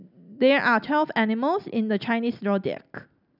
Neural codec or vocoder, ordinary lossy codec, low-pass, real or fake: none; none; 5.4 kHz; real